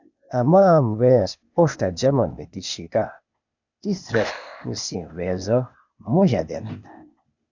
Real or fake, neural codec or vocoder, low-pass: fake; codec, 16 kHz, 0.8 kbps, ZipCodec; 7.2 kHz